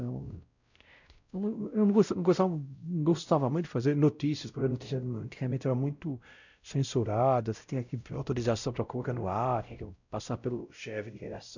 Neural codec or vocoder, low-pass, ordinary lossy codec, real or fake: codec, 16 kHz, 0.5 kbps, X-Codec, WavLM features, trained on Multilingual LibriSpeech; 7.2 kHz; none; fake